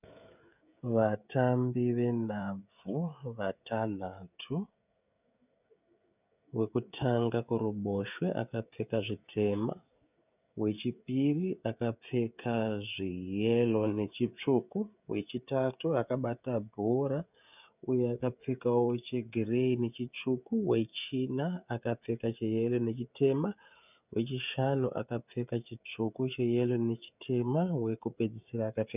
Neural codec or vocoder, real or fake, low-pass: codec, 16 kHz, 16 kbps, FreqCodec, smaller model; fake; 3.6 kHz